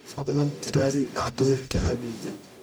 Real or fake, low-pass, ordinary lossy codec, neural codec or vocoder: fake; none; none; codec, 44.1 kHz, 0.9 kbps, DAC